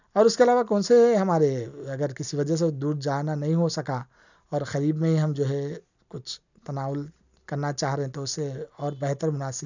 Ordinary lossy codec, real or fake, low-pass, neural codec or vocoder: none; real; 7.2 kHz; none